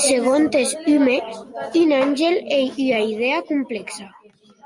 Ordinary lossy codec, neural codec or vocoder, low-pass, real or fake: Opus, 64 kbps; none; 10.8 kHz; real